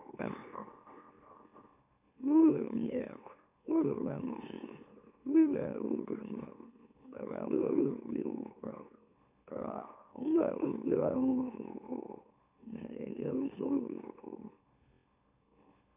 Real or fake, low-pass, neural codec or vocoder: fake; 3.6 kHz; autoencoder, 44.1 kHz, a latent of 192 numbers a frame, MeloTTS